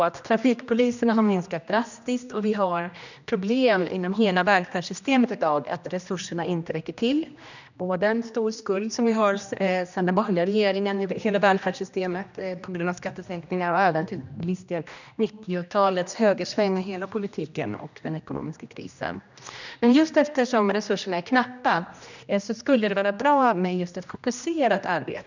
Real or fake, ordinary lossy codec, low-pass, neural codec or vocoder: fake; none; 7.2 kHz; codec, 16 kHz, 1 kbps, X-Codec, HuBERT features, trained on general audio